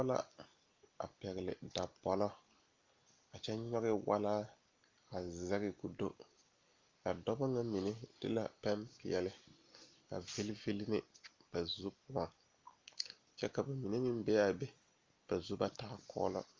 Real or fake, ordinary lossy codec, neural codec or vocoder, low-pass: real; Opus, 32 kbps; none; 7.2 kHz